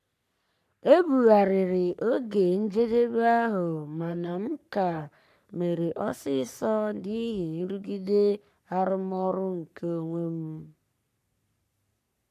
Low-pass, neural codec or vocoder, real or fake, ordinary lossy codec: 14.4 kHz; codec, 44.1 kHz, 3.4 kbps, Pupu-Codec; fake; none